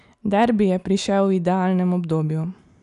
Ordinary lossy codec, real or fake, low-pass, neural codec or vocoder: none; real; 10.8 kHz; none